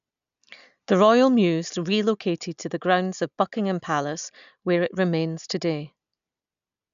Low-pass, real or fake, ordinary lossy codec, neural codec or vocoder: 7.2 kHz; real; none; none